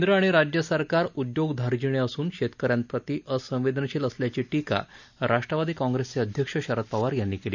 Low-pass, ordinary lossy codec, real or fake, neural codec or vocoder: 7.2 kHz; none; real; none